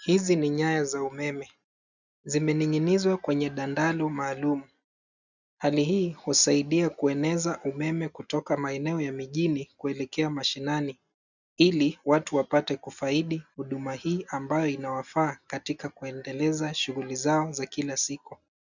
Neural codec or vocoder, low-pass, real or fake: none; 7.2 kHz; real